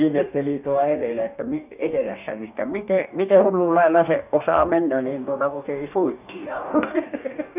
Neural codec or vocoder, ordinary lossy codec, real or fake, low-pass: codec, 44.1 kHz, 2.6 kbps, DAC; none; fake; 3.6 kHz